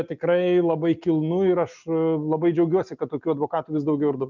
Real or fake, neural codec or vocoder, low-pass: real; none; 7.2 kHz